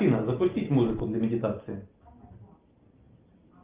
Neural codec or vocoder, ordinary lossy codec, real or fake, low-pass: none; Opus, 32 kbps; real; 3.6 kHz